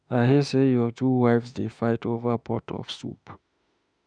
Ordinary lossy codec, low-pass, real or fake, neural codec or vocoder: none; 9.9 kHz; fake; autoencoder, 48 kHz, 32 numbers a frame, DAC-VAE, trained on Japanese speech